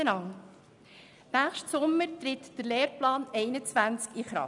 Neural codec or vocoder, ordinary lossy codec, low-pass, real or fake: none; none; 10.8 kHz; real